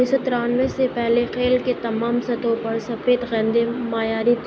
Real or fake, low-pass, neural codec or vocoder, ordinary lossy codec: real; none; none; none